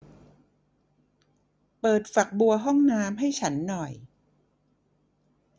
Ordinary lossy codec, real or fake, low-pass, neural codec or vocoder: none; real; none; none